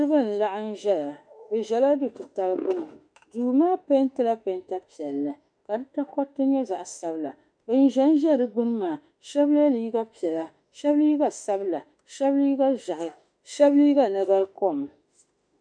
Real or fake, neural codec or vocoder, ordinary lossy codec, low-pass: fake; autoencoder, 48 kHz, 32 numbers a frame, DAC-VAE, trained on Japanese speech; MP3, 96 kbps; 9.9 kHz